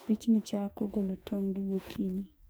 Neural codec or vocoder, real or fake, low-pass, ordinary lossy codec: codec, 44.1 kHz, 2.6 kbps, SNAC; fake; none; none